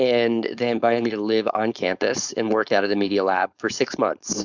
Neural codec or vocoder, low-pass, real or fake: codec, 16 kHz, 4.8 kbps, FACodec; 7.2 kHz; fake